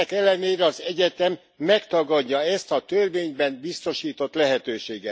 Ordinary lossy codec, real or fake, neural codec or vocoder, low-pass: none; real; none; none